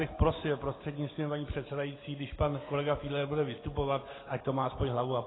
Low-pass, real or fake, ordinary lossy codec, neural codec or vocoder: 7.2 kHz; real; AAC, 16 kbps; none